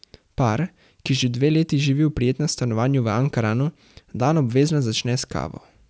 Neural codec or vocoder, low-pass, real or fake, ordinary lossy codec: none; none; real; none